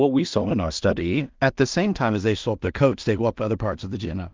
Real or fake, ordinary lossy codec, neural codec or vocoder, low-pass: fake; Opus, 32 kbps; codec, 16 kHz in and 24 kHz out, 0.4 kbps, LongCat-Audio-Codec, two codebook decoder; 7.2 kHz